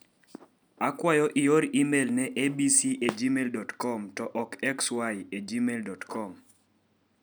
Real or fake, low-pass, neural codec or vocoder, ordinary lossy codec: real; none; none; none